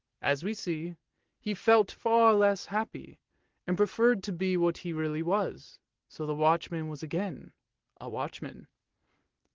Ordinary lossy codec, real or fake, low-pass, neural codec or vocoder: Opus, 16 kbps; real; 7.2 kHz; none